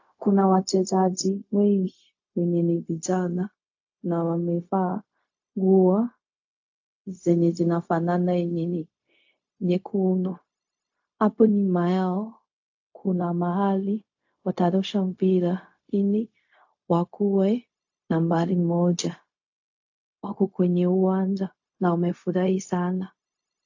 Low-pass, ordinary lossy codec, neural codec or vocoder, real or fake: 7.2 kHz; AAC, 48 kbps; codec, 16 kHz, 0.4 kbps, LongCat-Audio-Codec; fake